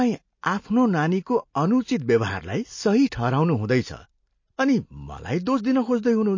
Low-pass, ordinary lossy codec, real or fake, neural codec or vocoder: 7.2 kHz; MP3, 32 kbps; real; none